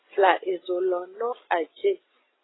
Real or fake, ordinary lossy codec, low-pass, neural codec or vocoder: fake; AAC, 16 kbps; 7.2 kHz; vocoder, 44.1 kHz, 128 mel bands every 256 samples, BigVGAN v2